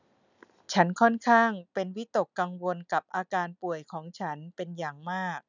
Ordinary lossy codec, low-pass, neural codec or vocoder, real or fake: none; 7.2 kHz; none; real